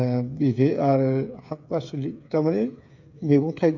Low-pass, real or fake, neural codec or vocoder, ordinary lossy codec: 7.2 kHz; fake; codec, 16 kHz, 8 kbps, FreqCodec, smaller model; none